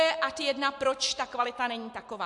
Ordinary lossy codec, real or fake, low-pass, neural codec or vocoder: AAC, 64 kbps; real; 10.8 kHz; none